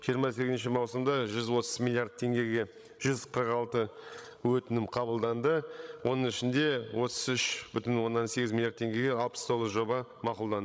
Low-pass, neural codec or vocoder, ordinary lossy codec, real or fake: none; codec, 16 kHz, 16 kbps, FreqCodec, larger model; none; fake